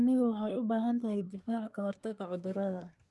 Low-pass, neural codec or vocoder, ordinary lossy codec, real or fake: none; codec, 24 kHz, 1 kbps, SNAC; none; fake